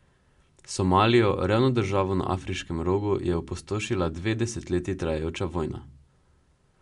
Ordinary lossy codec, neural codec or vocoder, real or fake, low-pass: MP3, 64 kbps; none; real; 10.8 kHz